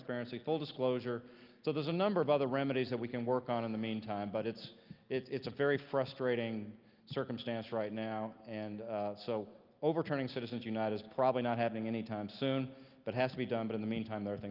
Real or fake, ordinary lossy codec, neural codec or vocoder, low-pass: real; Opus, 24 kbps; none; 5.4 kHz